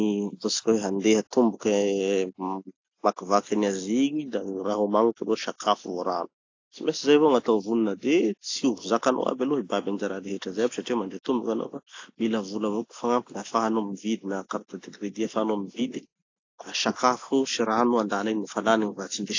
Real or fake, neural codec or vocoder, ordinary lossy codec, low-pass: real; none; AAC, 48 kbps; 7.2 kHz